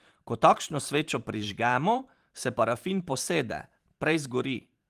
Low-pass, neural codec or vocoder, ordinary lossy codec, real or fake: 14.4 kHz; vocoder, 44.1 kHz, 128 mel bands every 512 samples, BigVGAN v2; Opus, 24 kbps; fake